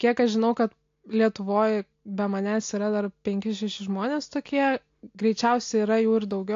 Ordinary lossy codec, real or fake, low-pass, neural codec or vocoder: AAC, 48 kbps; real; 7.2 kHz; none